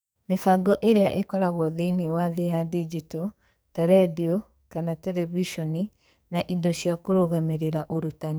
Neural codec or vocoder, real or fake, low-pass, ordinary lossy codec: codec, 44.1 kHz, 2.6 kbps, SNAC; fake; none; none